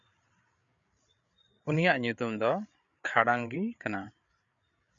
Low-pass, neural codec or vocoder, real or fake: 7.2 kHz; codec, 16 kHz, 8 kbps, FreqCodec, larger model; fake